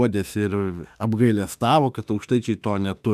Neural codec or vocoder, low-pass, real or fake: autoencoder, 48 kHz, 32 numbers a frame, DAC-VAE, trained on Japanese speech; 14.4 kHz; fake